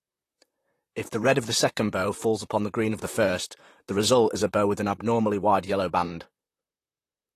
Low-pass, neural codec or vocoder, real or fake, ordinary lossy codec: 14.4 kHz; vocoder, 44.1 kHz, 128 mel bands, Pupu-Vocoder; fake; AAC, 48 kbps